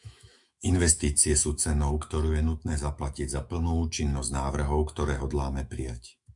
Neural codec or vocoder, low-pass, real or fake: autoencoder, 48 kHz, 128 numbers a frame, DAC-VAE, trained on Japanese speech; 10.8 kHz; fake